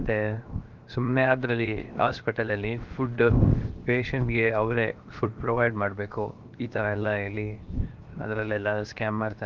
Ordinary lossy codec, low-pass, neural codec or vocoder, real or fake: Opus, 32 kbps; 7.2 kHz; codec, 16 kHz, 0.7 kbps, FocalCodec; fake